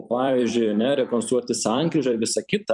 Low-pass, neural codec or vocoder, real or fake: 10.8 kHz; vocoder, 44.1 kHz, 128 mel bands every 256 samples, BigVGAN v2; fake